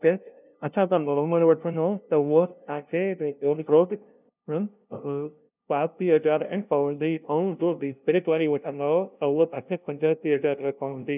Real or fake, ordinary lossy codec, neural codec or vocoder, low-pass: fake; none; codec, 16 kHz, 0.5 kbps, FunCodec, trained on LibriTTS, 25 frames a second; 3.6 kHz